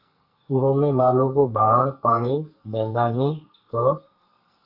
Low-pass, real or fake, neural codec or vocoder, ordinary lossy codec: 5.4 kHz; fake; codec, 44.1 kHz, 2.6 kbps, SNAC; Opus, 64 kbps